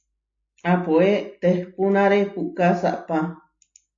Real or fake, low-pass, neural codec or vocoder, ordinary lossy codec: real; 7.2 kHz; none; AAC, 48 kbps